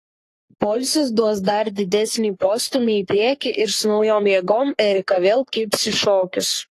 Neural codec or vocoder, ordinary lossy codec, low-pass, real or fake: codec, 44.1 kHz, 3.4 kbps, Pupu-Codec; AAC, 48 kbps; 14.4 kHz; fake